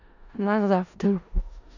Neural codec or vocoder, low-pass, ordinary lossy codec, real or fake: codec, 16 kHz in and 24 kHz out, 0.4 kbps, LongCat-Audio-Codec, four codebook decoder; 7.2 kHz; none; fake